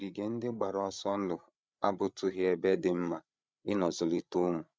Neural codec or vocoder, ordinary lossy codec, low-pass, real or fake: codec, 16 kHz, 8 kbps, FreqCodec, larger model; none; none; fake